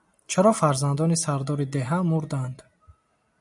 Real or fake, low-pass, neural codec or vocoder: real; 10.8 kHz; none